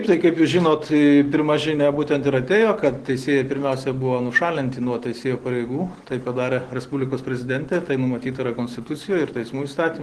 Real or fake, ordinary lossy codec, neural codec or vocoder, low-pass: real; Opus, 16 kbps; none; 10.8 kHz